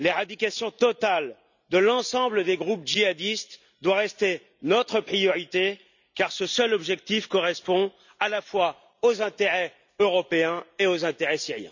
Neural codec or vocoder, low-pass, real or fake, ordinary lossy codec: none; 7.2 kHz; real; none